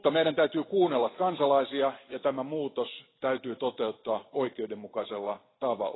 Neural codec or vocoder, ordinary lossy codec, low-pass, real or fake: none; AAC, 16 kbps; 7.2 kHz; real